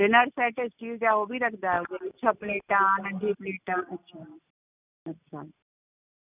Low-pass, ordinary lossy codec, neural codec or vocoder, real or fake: 3.6 kHz; none; none; real